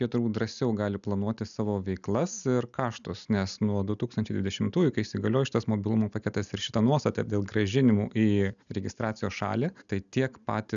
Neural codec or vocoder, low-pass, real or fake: none; 7.2 kHz; real